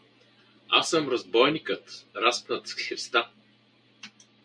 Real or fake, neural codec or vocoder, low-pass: real; none; 9.9 kHz